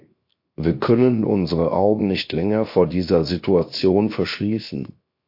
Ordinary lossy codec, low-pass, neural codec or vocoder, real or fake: MP3, 32 kbps; 5.4 kHz; codec, 16 kHz, 0.7 kbps, FocalCodec; fake